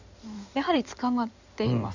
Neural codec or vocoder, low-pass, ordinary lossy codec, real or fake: codec, 16 kHz in and 24 kHz out, 2.2 kbps, FireRedTTS-2 codec; 7.2 kHz; none; fake